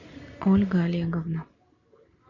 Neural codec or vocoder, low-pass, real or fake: none; 7.2 kHz; real